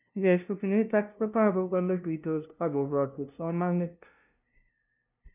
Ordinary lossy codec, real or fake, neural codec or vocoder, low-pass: none; fake; codec, 16 kHz, 0.5 kbps, FunCodec, trained on LibriTTS, 25 frames a second; 3.6 kHz